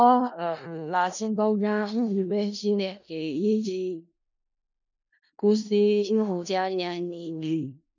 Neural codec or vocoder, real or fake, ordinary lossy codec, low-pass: codec, 16 kHz in and 24 kHz out, 0.4 kbps, LongCat-Audio-Codec, four codebook decoder; fake; none; 7.2 kHz